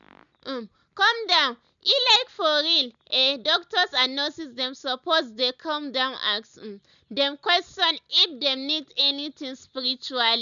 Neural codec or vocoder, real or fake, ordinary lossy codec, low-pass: none; real; none; 7.2 kHz